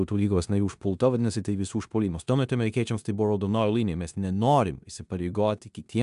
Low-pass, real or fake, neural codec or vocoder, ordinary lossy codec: 10.8 kHz; fake; codec, 16 kHz in and 24 kHz out, 0.9 kbps, LongCat-Audio-Codec, four codebook decoder; AAC, 96 kbps